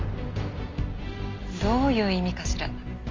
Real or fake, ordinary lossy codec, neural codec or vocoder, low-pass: real; Opus, 32 kbps; none; 7.2 kHz